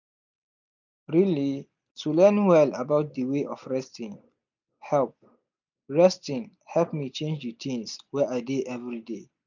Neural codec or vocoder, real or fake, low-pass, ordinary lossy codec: none; real; 7.2 kHz; none